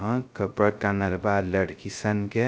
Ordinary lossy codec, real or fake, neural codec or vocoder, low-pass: none; fake; codec, 16 kHz, 0.2 kbps, FocalCodec; none